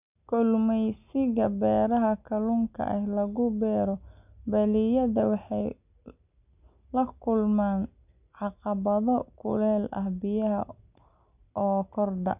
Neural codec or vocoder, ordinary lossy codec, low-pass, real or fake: none; none; 3.6 kHz; real